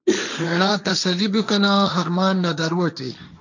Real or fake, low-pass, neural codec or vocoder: fake; 7.2 kHz; codec, 16 kHz, 1.1 kbps, Voila-Tokenizer